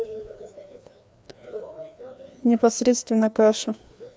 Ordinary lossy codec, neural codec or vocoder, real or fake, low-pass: none; codec, 16 kHz, 2 kbps, FreqCodec, larger model; fake; none